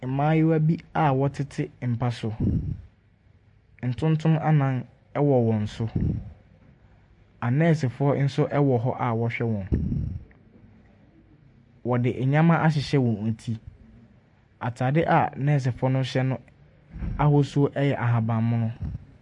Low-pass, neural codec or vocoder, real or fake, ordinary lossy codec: 10.8 kHz; none; real; MP3, 48 kbps